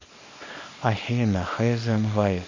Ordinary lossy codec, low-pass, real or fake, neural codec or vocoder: MP3, 32 kbps; 7.2 kHz; fake; codec, 24 kHz, 0.9 kbps, WavTokenizer, small release